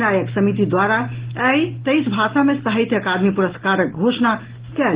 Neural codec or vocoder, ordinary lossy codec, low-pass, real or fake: none; Opus, 16 kbps; 3.6 kHz; real